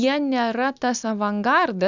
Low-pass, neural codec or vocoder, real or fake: 7.2 kHz; none; real